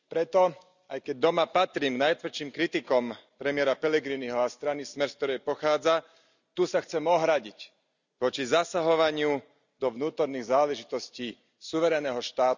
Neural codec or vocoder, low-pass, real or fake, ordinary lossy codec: none; 7.2 kHz; real; none